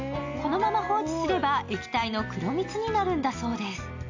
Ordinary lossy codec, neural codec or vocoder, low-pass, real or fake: AAC, 48 kbps; none; 7.2 kHz; real